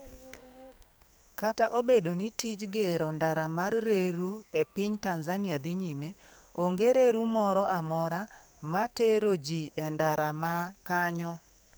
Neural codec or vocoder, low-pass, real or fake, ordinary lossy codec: codec, 44.1 kHz, 2.6 kbps, SNAC; none; fake; none